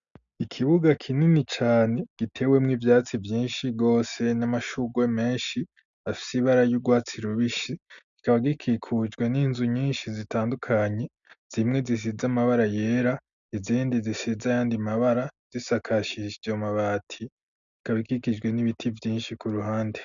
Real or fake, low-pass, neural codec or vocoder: real; 7.2 kHz; none